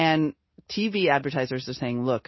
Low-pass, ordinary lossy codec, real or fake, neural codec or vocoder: 7.2 kHz; MP3, 24 kbps; real; none